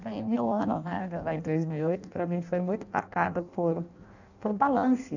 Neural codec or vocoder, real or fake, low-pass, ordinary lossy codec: codec, 16 kHz in and 24 kHz out, 0.6 kbps, FireRedTTS-2 codec; fake; 7.2 kHz; none